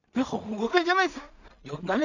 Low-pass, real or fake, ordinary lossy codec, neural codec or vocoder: 7.2 kHz; fake; none; codec, 16 kHz in and 24 kHz out, 0.4 kbps, LongCat-Audio-Codec, two codebook decoder